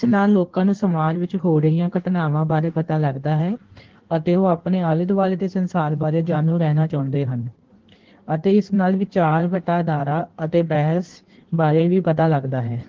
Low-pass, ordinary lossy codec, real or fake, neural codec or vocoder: 7.2 kHz; Opus, 16 kbps; fake; codec, 16 kHz in and 24 kHz out, 1.1 kbps, FireRedTTS-2 codec